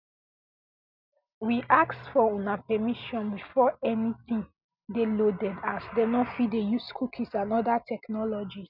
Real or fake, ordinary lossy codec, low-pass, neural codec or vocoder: real; none; 5.4 kHz; none